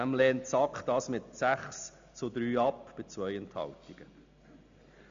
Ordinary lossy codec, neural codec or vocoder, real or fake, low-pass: AAC, 64 kbps; none; real; 7.2 kHz